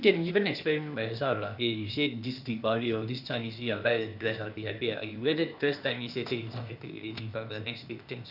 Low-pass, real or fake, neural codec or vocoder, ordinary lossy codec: 5.4 kHz; fake; codec, 16 kHz, 0.8 kbps, ZipCodec; none